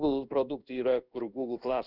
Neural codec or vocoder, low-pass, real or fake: codec, 16 kHz in and 24 kHz out, 1 kbps, XY-Tokenizer; 5.4 kHz; fake